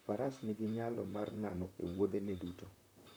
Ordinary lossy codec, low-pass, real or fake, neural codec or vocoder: none; none; fake; vocoder, 44.1 kHz, 128 mel bands, Pupu-Vocoder